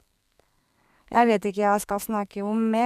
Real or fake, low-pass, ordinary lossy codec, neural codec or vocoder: fake; 14.4 kHz; none; codec, 32 kHz, 1.9 kbps, SNAC